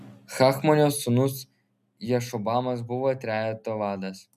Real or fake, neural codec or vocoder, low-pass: real; none; 14.4 kHz